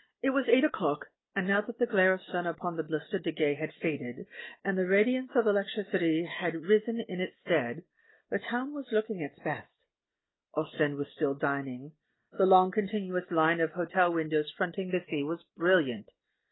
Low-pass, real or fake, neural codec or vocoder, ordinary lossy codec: 7.2 kHz; real; none; AAC, 16 kbps